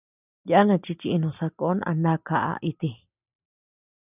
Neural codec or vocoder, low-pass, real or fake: none; 3.6 kHz; real